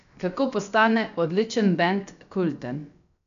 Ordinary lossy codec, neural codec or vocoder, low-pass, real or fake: none; codec, 16 kHz, about 1 kbps, DyCAST, with the encoder's durations; 7.2 kHz; fake